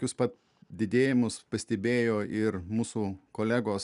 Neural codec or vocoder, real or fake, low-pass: none; real; 10.8 kHz